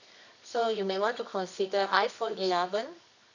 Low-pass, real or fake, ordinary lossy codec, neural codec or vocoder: 7.2 kHz; fake; none; codec, 24 kHz, 0.9 kbps, WavTokenizer, medium music audio release